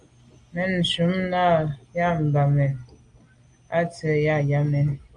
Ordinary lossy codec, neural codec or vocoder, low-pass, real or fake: Opus, 32 kbps; none; 9.9 kHz; real